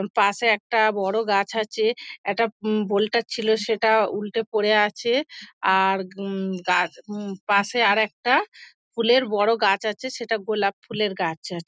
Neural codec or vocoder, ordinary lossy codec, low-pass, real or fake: none; none; none; real